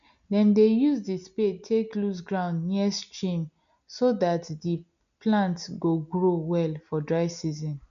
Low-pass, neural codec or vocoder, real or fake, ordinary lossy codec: 7.2 kHz; none; real; none